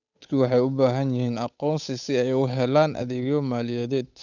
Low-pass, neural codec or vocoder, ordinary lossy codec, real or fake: 7.2 kHz; codec, 16 kHz, 8 kbps, FunCodec, trained on Chinese and English, 25 frames a second; none; fake